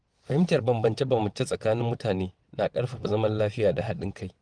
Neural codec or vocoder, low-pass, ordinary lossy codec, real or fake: vocoder, 22.05 kHz, 80 mel bands, WaveNeXt; 9.9 kHz; Opus, 24 kbps; fake